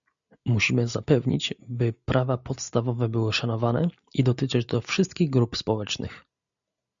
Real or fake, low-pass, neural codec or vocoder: real; 7.2 kHz; none